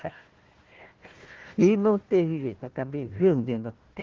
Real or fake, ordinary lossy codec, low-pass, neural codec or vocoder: fake; Opus, 16 kbps; 7.2 kHz; codec, 16 kHz, 1 kbps, FunCodec, trained on Chinese and English, 50 frames a second